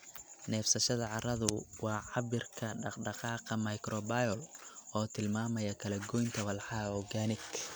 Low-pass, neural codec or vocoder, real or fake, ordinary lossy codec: none; none; real; none